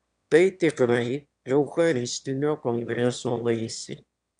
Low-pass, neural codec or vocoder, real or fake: 9.9 kHz; autoencoder, 22.05 kHz, a latent of 192 numbers a frame, VITS, trained on one speaker; fake